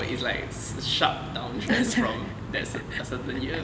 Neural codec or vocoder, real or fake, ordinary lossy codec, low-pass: none; real; none; none